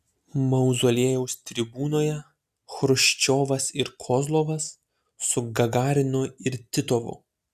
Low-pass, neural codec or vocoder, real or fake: 14.4 kHz; none; real